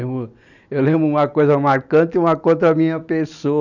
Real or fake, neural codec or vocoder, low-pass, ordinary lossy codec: real; none; 7.2 kHz; none